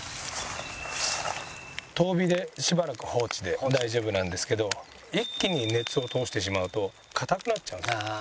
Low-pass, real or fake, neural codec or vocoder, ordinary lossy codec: none; real; none; none